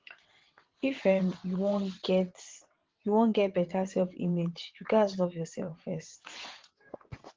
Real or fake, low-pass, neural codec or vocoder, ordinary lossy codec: fake; 7.2 kHz; vocoder, 22.05 kHz, 80 mel bands, WaveNeXt; Opus, 16 kbps